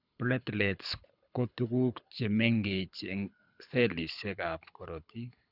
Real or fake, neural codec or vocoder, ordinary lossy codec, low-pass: fake; codec, 24 kHz, 6 kbps, HILCodec; none; 5.4 kHz